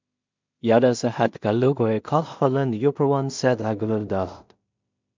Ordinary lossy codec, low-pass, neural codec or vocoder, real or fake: MP3, 64 kbps; 7.2 kHz; codec, 16 kHz in and 24 kHz out, 0.4 kbps, LongCat-Audio-Codec, two codebook decoder; fake